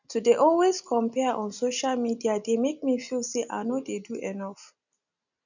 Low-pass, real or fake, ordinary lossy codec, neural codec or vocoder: 7.2 kHz; real; none; none